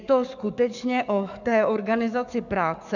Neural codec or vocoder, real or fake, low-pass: codec, 44.1 kHz, 7.8 kbps, DAC; fake; 7.2 kHz